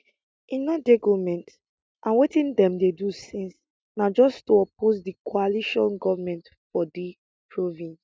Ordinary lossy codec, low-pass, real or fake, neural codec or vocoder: none; none; real; none